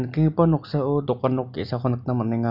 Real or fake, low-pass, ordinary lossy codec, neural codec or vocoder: real; 5.4 kHz; none; none